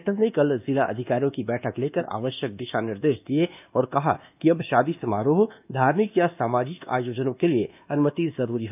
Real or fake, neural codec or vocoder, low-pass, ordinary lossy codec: fake; codec, 24 kHz, 1.2 kbps, DualCodec; 3.6 kHz; none